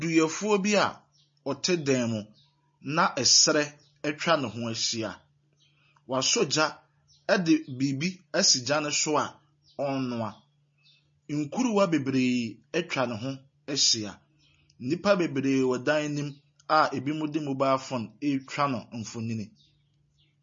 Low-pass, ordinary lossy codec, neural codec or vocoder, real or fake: 7.2 kHz; MP3, 32 kbps; none; real